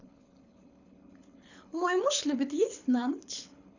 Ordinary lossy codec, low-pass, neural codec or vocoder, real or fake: none; 7.2 kHz; codec, 24 kHz, 6 kbps, HILCodec; fake